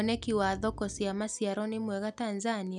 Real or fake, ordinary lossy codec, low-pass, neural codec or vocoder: real; none; 10.8 kHz; none